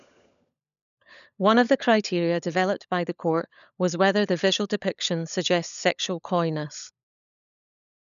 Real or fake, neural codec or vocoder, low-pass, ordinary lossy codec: fake; codec, 16 kHz, 16 kbps, FunCodec, trained on LibriTTS, 50 frames a second; 7.2 kHz; none